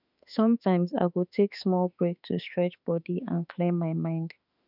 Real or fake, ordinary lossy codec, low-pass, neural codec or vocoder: fake; none; 5.4 kHz; autoencoder, 48 kHz, 32 numbers a frame, DAC-VAE, trained on Japanese speech